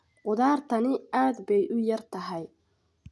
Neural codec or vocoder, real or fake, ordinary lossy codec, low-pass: none; real; none; none